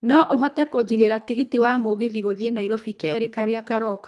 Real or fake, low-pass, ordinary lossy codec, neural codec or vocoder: fake; none; none; codec, 24 kHz, 1.5 kbps, HILCodec